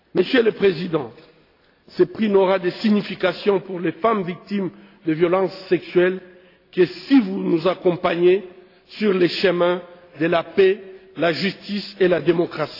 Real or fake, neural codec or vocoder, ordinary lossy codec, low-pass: real; none; AAC, 32 kbps; 5.4 kHz